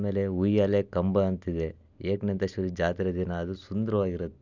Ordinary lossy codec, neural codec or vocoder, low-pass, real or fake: none; none; 7.2 kHz; real